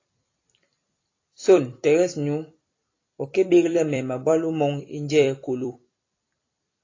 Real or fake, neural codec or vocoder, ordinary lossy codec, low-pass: fake; vocoder, 44.1 kHz, 128 mel bands every 256 samples, BigVGAN v2; AAC, 32 kbps; 7.2 kHz